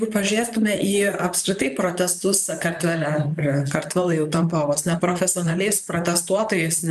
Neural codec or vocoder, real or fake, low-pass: vocoder, 44.1 kHz, 128 mel bands, Pupu-Vocoder; fake; 14.4 kHz